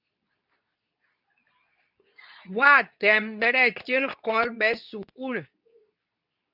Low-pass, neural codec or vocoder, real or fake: 5.4 kHz; codec, 24 kHz, 0.9 kbps, WavTokenizer, medium speech release version 2; fake